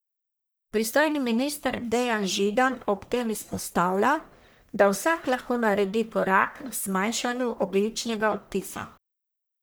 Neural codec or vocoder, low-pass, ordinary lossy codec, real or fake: codec, 44.1 kHz, 1.7 kbps, Pupu-Codec; none; none; fake